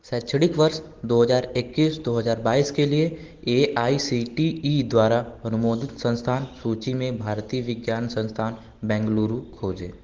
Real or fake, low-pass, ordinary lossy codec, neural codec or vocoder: real; 7.2 kHz; Opus, 16 kbps; none